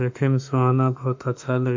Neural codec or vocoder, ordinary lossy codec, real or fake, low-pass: autoencoder, 48 kHz, 32 numbers a frame, DAC-VAE, trained on Japanese speech; MP3, 48 kbps; fake; 7.2 kHz